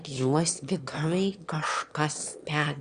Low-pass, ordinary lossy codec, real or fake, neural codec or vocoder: 9.9 kHz; Opus, 64 kbps; fake; autoencoder, 22.05 kHz, a latent of 192 numbers a frame, VITS, trained on one speaker